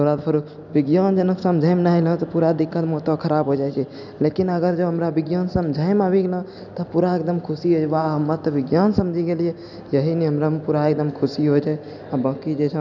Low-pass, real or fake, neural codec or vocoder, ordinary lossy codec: 7.2 kHz; real; none; none